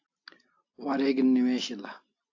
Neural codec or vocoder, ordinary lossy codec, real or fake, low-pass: none; AAC, 48 kbps; real; 7.2 kHz